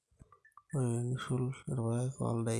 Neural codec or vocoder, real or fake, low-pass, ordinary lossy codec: none; real; none; none